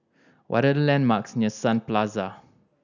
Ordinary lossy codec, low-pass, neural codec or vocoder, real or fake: none; 7.2 kHz; none; real